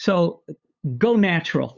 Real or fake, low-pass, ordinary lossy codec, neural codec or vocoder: fake; 7.2 kHz; Opus, 64 kbps; codec, 16 kHz, 8 kbps, FunCodec, trained on LibriTTS, 25 frames a second